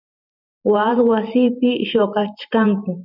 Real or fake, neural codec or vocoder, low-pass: fake; vocoder, 44.1 kHz, 128 mel bands every 512 samples, BigVGAN v2; 5.4 kHz